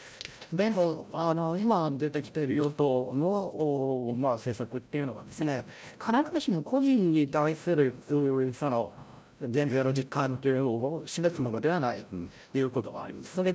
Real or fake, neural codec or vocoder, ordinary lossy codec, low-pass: fake; codec, 16 kHz, 0.5 kbps, FreqCodec, larger model; none; none